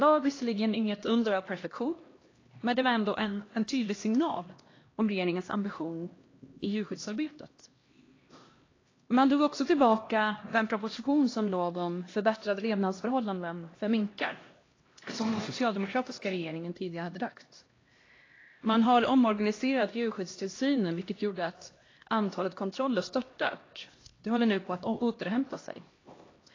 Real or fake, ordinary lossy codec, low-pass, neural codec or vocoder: fake; AAC, 32 kbps; 7.2 kHz; codec, 16 kHz, 1 kbps, X-Codec, HuBERT features, trained on LibriSpeech